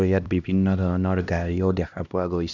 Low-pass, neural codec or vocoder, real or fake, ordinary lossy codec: 7.2 kHz; codec, 16 kHz, 1 kbps, X-Codec, HuBERT features, trained on LibriSpeech; fake; none